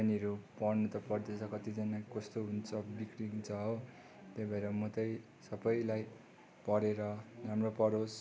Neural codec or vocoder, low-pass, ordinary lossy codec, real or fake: none; none; none; real